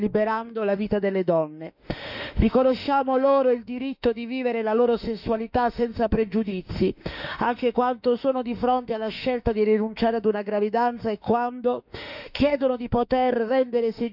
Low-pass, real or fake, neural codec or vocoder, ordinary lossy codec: 5.4 kHz; fake; autoencoder, 48 kHz, 32 numbers a frame, DAC-VAE, trained on Japanese speech; none